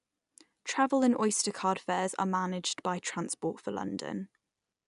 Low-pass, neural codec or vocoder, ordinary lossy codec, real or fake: 10.8 kHz; none; none; real